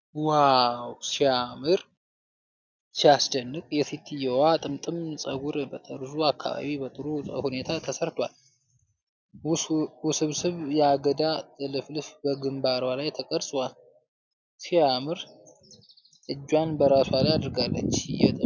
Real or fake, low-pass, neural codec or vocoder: real; 7.2 kHz; none